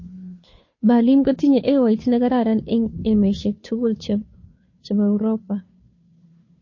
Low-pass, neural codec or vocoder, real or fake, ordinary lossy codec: 7.2 kHz; codec, 16 kHz, 2 kbps, FunCodec, trained on Chinese and English, 25 frames a second; fake; MP3, 32 kbps